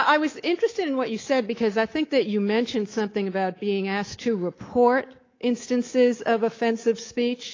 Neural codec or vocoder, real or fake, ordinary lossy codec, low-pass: codec, 24 kHz, 3.1 kbps, DualCodec; fake; AAC, 32 kbps; 7.2 kHz